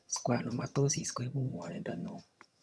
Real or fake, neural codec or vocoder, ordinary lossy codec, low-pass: fake; vocoder, 22.05 kHz, 80 mel bands, HiFi-GAN; none; none